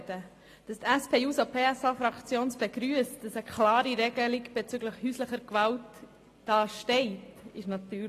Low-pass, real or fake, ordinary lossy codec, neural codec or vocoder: 14.4 kHz; real; AAC, 48 kbps; none